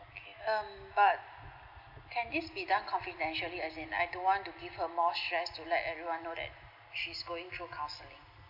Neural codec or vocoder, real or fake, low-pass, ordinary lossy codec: none; real; 5.4 kHz; none